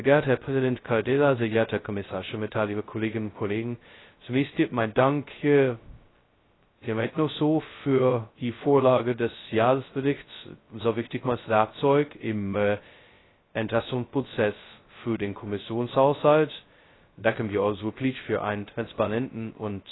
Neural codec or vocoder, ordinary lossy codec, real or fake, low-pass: codec, 16 kHz, 0.2 kbps, FocalCodec; AAC, 16 kbps; fake; 7.2 kHz